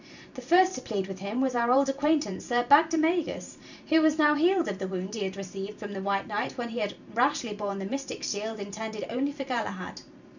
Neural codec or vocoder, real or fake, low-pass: vocoder, 44.1 kHz, 128 mel bands every 512 samples, BigVGAN v2; fake; 7.2 kHz